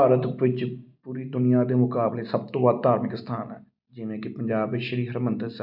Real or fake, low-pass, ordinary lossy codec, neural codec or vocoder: real; 5.4 kHz; none; none